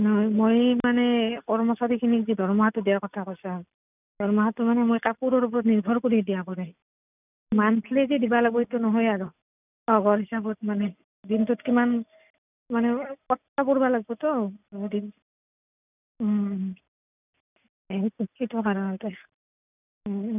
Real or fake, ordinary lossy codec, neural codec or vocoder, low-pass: real; none; none; 3.6 kHz